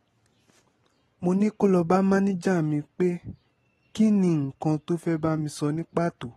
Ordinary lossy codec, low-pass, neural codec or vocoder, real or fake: AAC, 32 kbps; 19.8 kHz; none; real